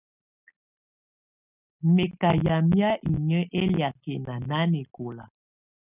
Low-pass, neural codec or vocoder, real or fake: 3.6 kHz; none; real